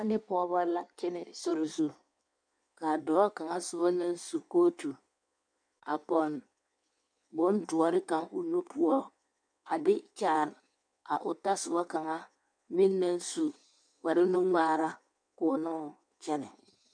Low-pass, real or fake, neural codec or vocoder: 9.9 kHz; fake; codec, 16 kHz in and 24 kHz out, 1.1 kbps, FireRedTTS-2 codec